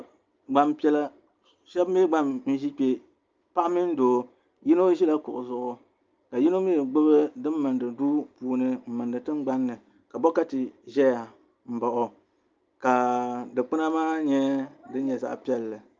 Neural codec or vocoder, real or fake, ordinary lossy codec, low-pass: none; real; Opus, 24 kbps; 7.2 kHz